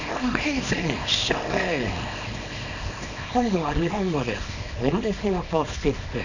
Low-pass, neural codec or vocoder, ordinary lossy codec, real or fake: 7.2 kHz; codec, 24 kHz, 0.9 kbps, WavTokenizer, small release; none; fake